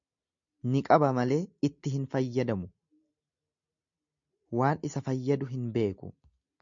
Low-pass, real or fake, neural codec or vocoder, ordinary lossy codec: 7.2 kHz; real; none; MP3, 64 kbps